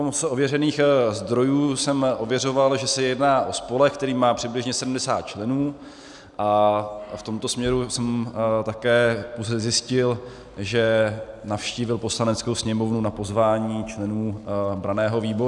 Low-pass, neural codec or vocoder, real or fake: 10.8 kHz; none; real